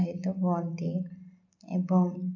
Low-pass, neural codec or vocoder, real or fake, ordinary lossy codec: 7.2 kHz; none; real; AAC, 48 kbps